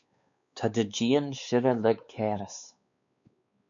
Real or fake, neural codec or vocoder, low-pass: fake; codec, 16 kHz, 4 kbps, X-Codec, WavLM features, trained on Multilingual LibriSpeech; 7.2 kHz